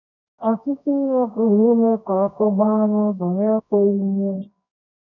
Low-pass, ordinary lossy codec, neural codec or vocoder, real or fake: 7.2 kHz; none; codec, 24 kHz, 0.9 kbps, WavTokenizer, medium music audio release; fake